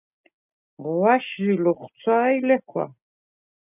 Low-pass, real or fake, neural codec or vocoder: 3.6 kHz; real; none